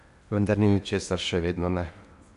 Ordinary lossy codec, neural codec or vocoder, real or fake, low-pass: AAC, 96 kbps; codec, 16 kHz in and 24 kHz out, 0.8 kbps, FocalCodec, streaming, 65536 codes; fake; 10.8 kHz